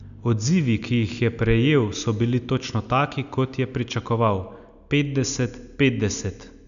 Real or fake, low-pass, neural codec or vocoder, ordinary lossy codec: real; 7.2 kHz; none; none